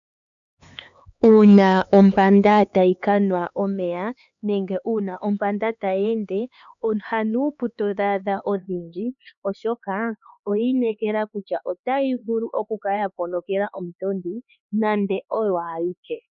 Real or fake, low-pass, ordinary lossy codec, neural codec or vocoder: fake; 7.2 kHz; MP3, 96 kbps; codec, 16 kHz, 4 kbps, X-Codec, HuBERT features, trained on LibriSpeech